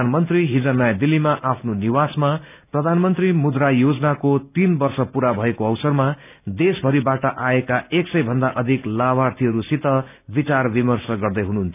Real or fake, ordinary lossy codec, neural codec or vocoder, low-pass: real; none; none; 3.6 kHz